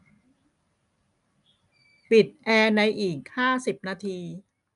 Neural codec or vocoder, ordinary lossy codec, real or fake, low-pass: none; MP3, 96 kbps; real; 10.8 kHz